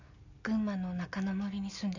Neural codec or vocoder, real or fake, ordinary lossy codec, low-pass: none; real; none; 7.2 kHz